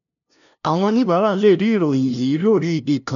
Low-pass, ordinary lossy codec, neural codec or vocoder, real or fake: 7.2 kHz; none; codec, 16 kHz, 0.5 kbps, FunCodec, trained on LibriTTS, 25 frames a second; fake